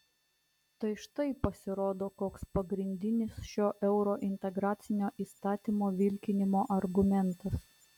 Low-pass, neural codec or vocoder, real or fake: 19.8 kHz; none; real